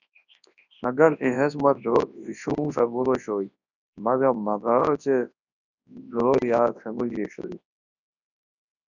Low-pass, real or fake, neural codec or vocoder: 7.2 kHz; fake; codec, 24 kHz, 0.9 kbps, WavTokenizer, large speech release